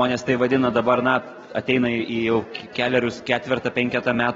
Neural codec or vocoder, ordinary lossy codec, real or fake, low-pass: none; AAC, 24 kbps; real; 7.2 kHz